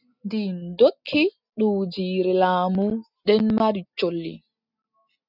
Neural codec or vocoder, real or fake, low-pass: none; real; 5.4 kHz